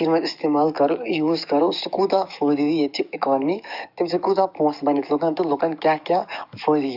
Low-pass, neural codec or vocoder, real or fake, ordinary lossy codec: 5.4 kHz; codec, 44.1 kHz, 7.8 kbps, DAC; fake; none